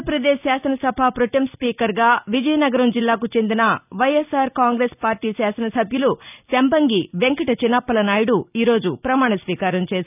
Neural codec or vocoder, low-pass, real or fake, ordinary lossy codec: none; 3.6 kHz; real; none